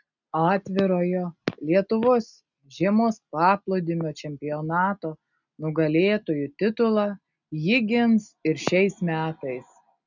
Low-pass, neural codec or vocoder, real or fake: 7.2 kHz; none; real